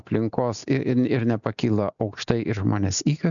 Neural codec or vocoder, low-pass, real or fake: none; 7.2 kHz; real